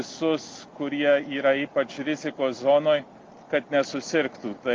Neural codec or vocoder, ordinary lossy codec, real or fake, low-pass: none; Opus, 24 kbps; real; 7.2 kHz